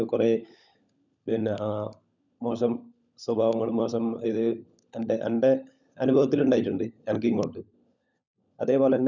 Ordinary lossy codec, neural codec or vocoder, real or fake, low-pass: none; codec, 16 kHz, 16 kbps, FunCodec, trained on LibriTTS, 50 frames a second; fake; 7.2 kHz